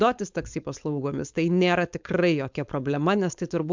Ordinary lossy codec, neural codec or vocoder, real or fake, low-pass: MP3, 64 kbps; codec, 16 kHz, 4.8 kbps, FACodec; fake; 7.2 kHz